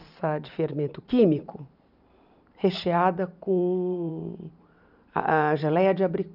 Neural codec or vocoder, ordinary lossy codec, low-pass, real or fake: vocoder, 44.1 kHz, 128 mel bands every 512 samples, BigVGAN v2; none; 5.4 kHz; fake